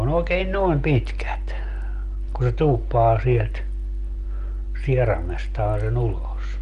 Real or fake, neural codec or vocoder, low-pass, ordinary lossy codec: real; none; 10.8 kHz; Opus, 32 kbps